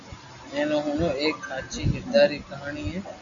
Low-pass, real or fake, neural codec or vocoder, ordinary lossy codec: 7.2 kHz; real; none; MP3, 96 kbps